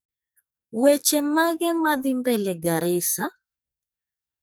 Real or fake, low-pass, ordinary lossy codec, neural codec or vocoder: fake; none; none; codec, 44.1 kHz, 2.6 kbps, SNAC